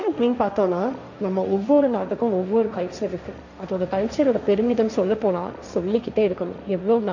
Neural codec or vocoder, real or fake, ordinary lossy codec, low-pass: codec, 16 kHz, 1.1 kbps, Voila-Tokenizer; fake; none; 7.2 kHz